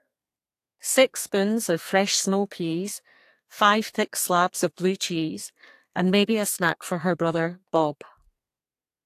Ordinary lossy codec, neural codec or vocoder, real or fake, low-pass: AAC, 64 kbps; codec, 32 kHz, 1.9 kbps, SNAC; fake; 14.4 kHz